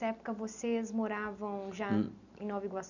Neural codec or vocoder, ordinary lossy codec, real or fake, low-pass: none; none; real; 7.2 kHz